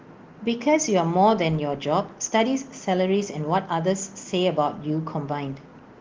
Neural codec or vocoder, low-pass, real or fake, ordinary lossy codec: none; 7.2 kHz; real; Opus, 16 kbps